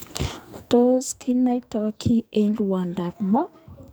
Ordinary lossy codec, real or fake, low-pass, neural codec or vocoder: none; fake; none; codec, 44.1 kHz, 2.6 kbps, SNAC